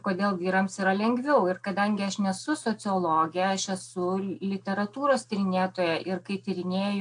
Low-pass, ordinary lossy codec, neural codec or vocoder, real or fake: 9.9 kHz; AAC, 64 kbps; none; real